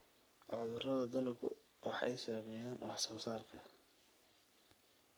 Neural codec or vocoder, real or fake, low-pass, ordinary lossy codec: codec, 44.1 kHz, 3.4 kbps, Pupu-Codec; fake; none; none